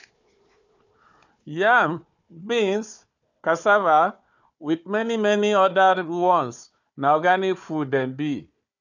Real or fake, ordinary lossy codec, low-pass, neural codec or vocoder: fake; none; 7.2 kHz; codec, 16 kHz, 4 kbps, FunCodec, trained on Chinese and English, 50 frames a second